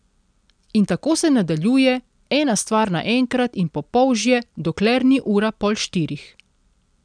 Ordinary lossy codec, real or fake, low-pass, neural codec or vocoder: none; real; 9.9 kHz; none